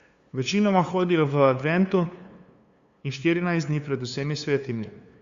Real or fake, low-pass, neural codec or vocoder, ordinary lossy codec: fake; 7.2 kHz; codec, 16 kHz, 2 kbps, FunCodec, trained on LibriTTS, 25 frames a second; Opus, 64 kbps